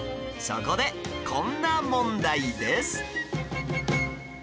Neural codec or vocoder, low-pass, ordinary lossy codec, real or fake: none; none; none; real